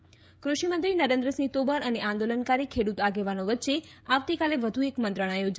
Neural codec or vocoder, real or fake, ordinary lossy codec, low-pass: codec, 16 kHz, 16 kbps, FreqCodec, smaller model; fake; none; none